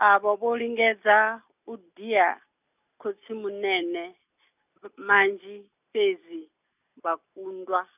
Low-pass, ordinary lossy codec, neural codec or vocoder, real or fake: 3.6 kHz; none; none; real